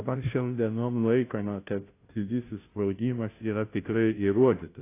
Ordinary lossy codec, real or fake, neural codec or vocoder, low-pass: AAC, 24 kbps; fake; codec, 16 kHz, 0.5 kbps, FunCodec, trained on Chinese and English, 25 frames a second; 3.6 kHz